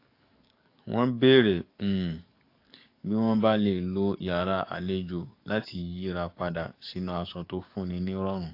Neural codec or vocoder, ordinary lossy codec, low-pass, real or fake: codec, 44.1 kHz, 7.8 kbps, DAC; AAC, 32 kbps; 5.4 kHz; fake